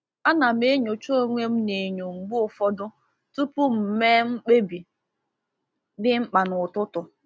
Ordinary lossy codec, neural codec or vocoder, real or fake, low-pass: none; none; real; none